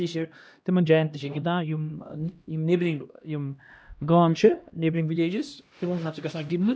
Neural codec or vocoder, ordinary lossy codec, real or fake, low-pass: codec, 16 kHz, 1 kbps, X-Codec, HuBERT features, trained on LibriSpeech; none; fake; none